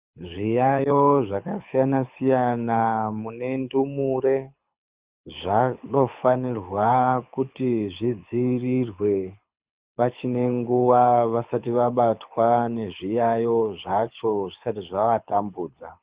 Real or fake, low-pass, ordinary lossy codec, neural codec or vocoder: fake; 3.6 kHz; Opus, 64 kbps; codec, 24 kHz, 6 kbps, HILCodec